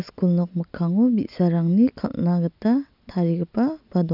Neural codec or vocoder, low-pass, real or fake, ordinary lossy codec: none; 5.4 kHz; real; none